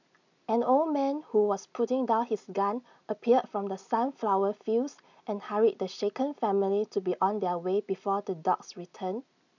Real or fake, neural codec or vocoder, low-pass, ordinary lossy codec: real; none; 7.2 kHz; none